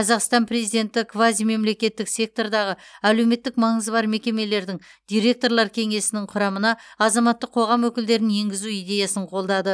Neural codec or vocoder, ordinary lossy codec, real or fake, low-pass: none; none; real; none